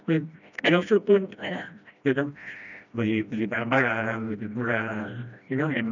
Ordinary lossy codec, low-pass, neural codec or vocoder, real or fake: none; 7.2 kHz; codec, 16 kHz, 1 kbps, FreqCodec, smaller model; fake